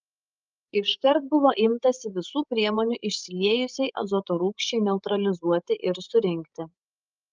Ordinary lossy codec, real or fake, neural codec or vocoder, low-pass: Opus, 24 kbps; fake; codec, 16 kHz, 16 kbps, FreqCodec, larger model; 7.2 kHz